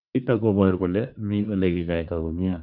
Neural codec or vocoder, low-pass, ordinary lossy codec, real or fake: codec, 24 kHz, 1 kbps, SNAC; 5.4 kHz; none; fake